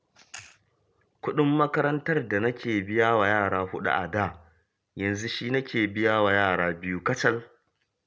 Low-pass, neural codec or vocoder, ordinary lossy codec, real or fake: none; none; none; real